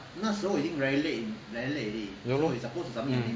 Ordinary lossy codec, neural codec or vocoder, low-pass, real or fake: none; none; none; real